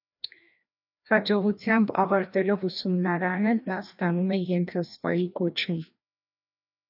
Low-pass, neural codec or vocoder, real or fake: 5.4 kHz; codec, 16 kHz, 1 kbps, FreqCodec, larger model; fake